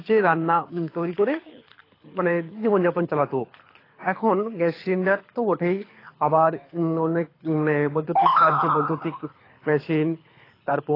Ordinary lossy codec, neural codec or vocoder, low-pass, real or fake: AAC, 24 kbps; codec, 24 kHz, 6 kbps, HILCodec; 5.4 kHz; fake